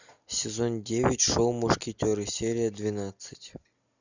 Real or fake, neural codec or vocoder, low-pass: real; none; 7.2 kHz